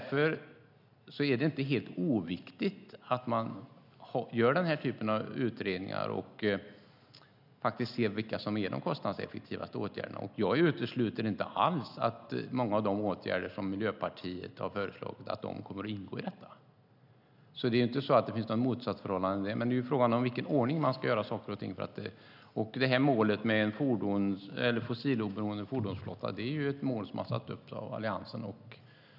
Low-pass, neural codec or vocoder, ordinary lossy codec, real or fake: 5.4 kHz; none; none; real